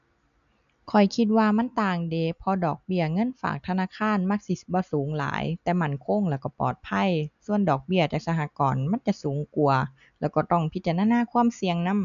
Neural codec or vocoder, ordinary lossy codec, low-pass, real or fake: none; none; 7.2 kHz; real